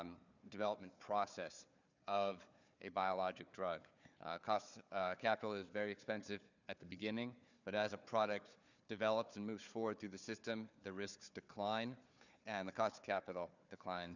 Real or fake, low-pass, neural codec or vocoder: fake; 7.2 kHz; codec, 16 kHz, 4 kbps, FunCodec, trained on Chinese and English, 50 frames a second